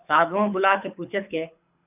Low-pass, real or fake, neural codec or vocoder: 3.6 kHz; fake; codec, 24 kHz, 6 kbps, HILCodec